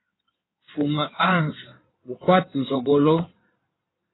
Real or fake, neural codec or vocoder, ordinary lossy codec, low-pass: fake; codec, 16 kHz in and 24 kHz out, 2.2 kbps, FireRedTTS-2 codec; AAC, 16 kbps; 7.2 kHz